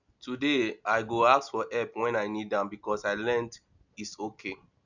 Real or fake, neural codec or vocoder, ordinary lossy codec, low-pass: real; none; none; 7.2 kHz